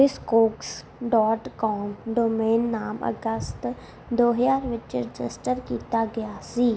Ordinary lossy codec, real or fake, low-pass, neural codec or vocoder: none; real; none; none